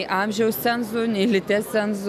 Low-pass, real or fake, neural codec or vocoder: 14.4 kHz; real; none